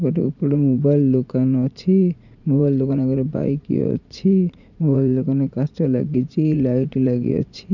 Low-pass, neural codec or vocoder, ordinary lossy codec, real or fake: 7.2 kHz; vocoder, 44.1 kHz, 80 mel bands, Vocos; none; fake